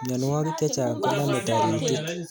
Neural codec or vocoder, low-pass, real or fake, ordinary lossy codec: none; none; real; none